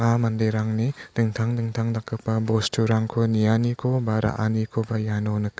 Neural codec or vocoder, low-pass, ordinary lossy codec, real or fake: none; none; none; real